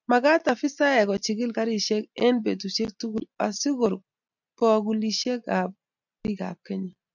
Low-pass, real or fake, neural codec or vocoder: 7.2 kHz; real; none